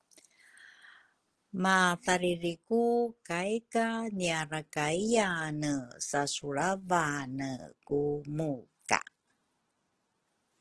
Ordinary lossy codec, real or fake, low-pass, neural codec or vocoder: Opus, 16 kbps; real; 10.8 kHz; none